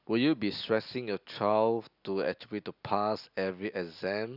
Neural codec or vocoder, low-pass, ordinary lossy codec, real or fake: none; 5.4 kHz; none; real